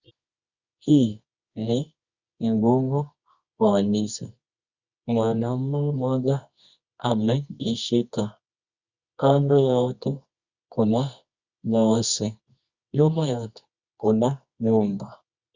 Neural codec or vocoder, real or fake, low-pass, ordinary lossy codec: codec, 24 kHz, 0.9 kbps, WavTokenizer, medium music audio release; fake; 7.2 kHz; Opus, 64 kbps